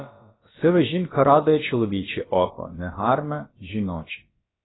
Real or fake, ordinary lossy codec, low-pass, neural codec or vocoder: fake; AAC, 16 kbps; 7.2 kHz; codec, 16 kHz, about 1 kbps, DyCAST, with the encoder's durations